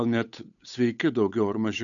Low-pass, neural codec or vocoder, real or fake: 7.2 kHz; codec, 16 kHz, 8 kbps, FunCodec, trained on Chinese and English, 25 frames a second; fake